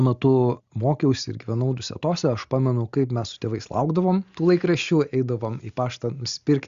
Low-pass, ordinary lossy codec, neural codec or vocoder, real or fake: 7.2 kHz; Opus, 64 kbps; none; real